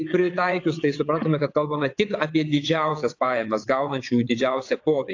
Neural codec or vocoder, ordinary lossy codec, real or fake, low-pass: vocoder, 22.05 kHz, 80 mel bands, WaveNeXt; AAC, 48 kbps; fake; 7.2 kHz